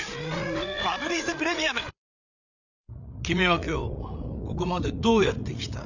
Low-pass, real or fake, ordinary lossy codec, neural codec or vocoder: 7.2 kHz; fake; none; codec, 16 kHz, 8 kbps, FreqCodec, larger model